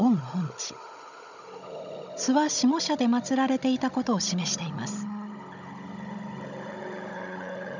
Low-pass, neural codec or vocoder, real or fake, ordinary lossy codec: 7.2 kHz; codec, 16 kHz, 16 kbps, FunCodec, trained on Chinese and English, 50 frames a second; fake; none